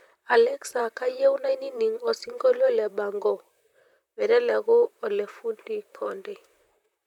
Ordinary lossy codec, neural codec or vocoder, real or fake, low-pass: none; vocoder, 44.1 kHz, 128 mel bands, Pupu-Vocoder; fake; 19.8 kHz